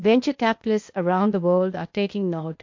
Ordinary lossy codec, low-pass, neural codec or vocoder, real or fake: MP3, 48 kbps; 7.2 kHz; codec, 16 kHz, 0.8 kbps, ZipCodec; fake